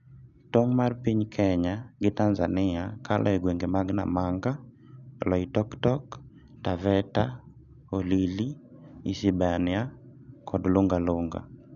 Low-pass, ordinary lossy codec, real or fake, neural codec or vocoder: 7.2 kHz; none; real; none